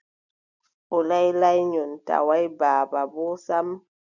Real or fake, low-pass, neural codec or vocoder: real; 7.2 kHz; none